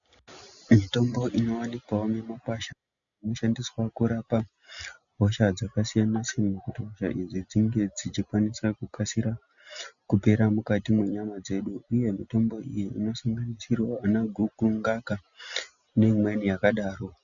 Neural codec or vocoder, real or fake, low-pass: none; real; 7.2 kHz